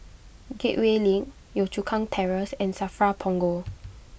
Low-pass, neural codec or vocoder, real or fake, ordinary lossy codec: none; none; real; none